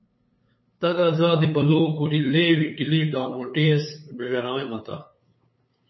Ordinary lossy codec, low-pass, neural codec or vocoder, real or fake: MP3, 24 kbps; 7.2 kHz; codec, 16 kHz, 8 kbps, FunCodec, trained on LibriTTS, 25 frames a second; fake